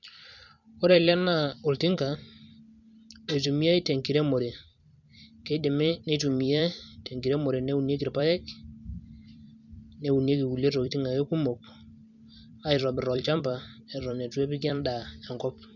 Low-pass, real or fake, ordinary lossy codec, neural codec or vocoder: 7.2 kHz; fake; none; vocoder, 44.1 kHz, 128 mel bands every 256 samples, BigVGAN v2